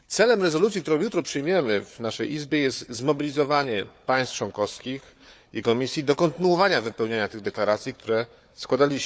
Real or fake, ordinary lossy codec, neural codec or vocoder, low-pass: fake; none; codec, 16 kHz, 4 kbps, FunCodec, trained on Chinese and English, 50 frames a second; none